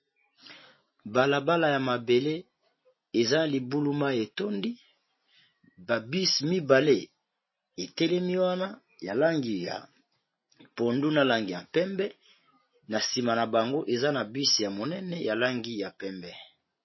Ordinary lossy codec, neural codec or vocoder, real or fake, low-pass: MP3, 24 kbps; none; real; 7.2 kHz